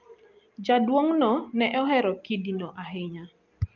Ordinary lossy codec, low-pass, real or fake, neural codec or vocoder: Opus, 24 kbps; 7.2 kHz; real; none